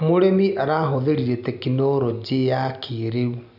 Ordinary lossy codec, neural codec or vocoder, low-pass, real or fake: none; none; 5.4 kHz; real